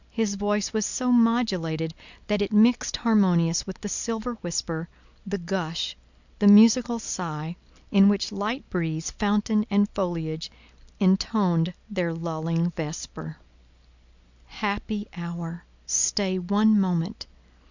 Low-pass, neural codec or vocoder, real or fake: 7.2 kHz; none; real